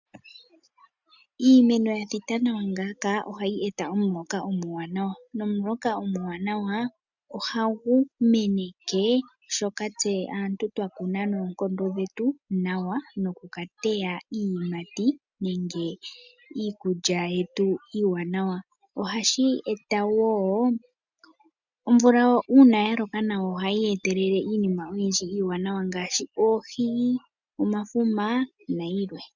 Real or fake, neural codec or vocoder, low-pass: real; none; 7.2 kHz